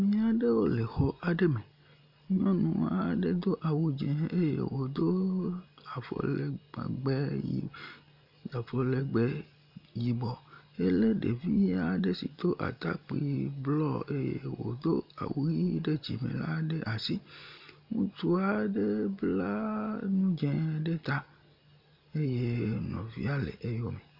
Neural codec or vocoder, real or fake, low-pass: none; real; 5.4 kHz